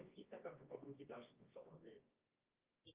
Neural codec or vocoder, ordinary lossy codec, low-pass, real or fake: codec, 24 kHz, 0.9 kbps, WavTokenizer, medium music audio release; Opus, 24 kbps; 3.6 kHz; fake